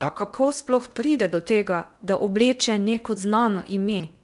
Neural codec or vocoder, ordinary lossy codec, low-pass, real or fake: codec, 16 kHz in and 24 kHz out, 0.8 kbps, FocalCodec, streaming, 65536 codes; none; 10.8 kHz; fake